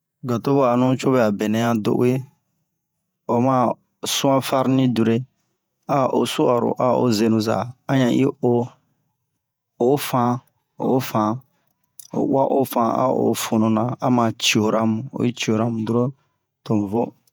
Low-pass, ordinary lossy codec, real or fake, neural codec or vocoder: none; none; fake; vocoder, 48 kHz, 128 mel bands, Vocos